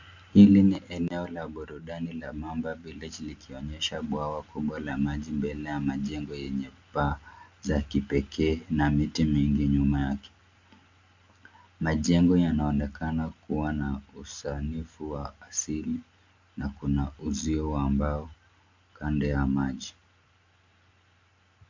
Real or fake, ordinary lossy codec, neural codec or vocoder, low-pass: real; MP3, 64 kbps; none; 7.2 kHz